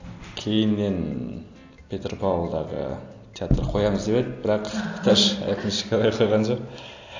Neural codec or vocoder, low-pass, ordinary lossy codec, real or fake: none; 7.2 kHz; AAC, 48 kbps; real